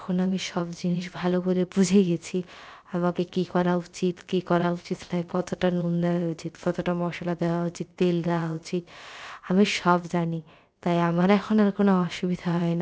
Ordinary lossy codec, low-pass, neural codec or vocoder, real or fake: none; none; codec, 16 kHz, about 1 kbps, DyCAST, with the encoder's durations; fake